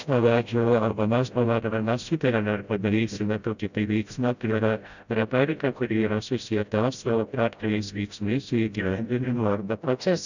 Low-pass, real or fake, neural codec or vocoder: 7.2 kHz; fake; codec, 16 kHz, 0.5 kbps, FreqCodec, smaller model